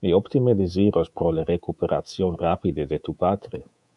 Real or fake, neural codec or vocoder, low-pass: fake; codec, 24 kHz, 3.1 kbps, DualCodec; 10.8 kHz